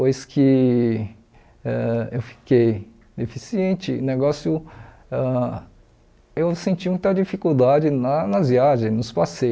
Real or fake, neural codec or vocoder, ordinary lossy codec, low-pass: real; none; none; none